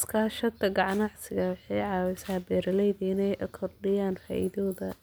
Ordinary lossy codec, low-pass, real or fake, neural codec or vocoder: none; none; real; none